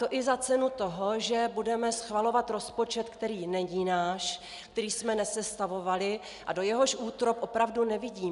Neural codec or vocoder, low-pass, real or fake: none; 10.8 kHz; real